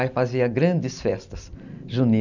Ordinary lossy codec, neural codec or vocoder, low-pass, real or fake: none; none; 7.2 kHz; real